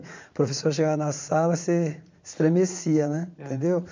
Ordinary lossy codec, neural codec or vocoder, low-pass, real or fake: MP3, 64 kbps; vocoder, 44.1 kHz, 80 mel bands, Vocos; 7.2 kHz; fake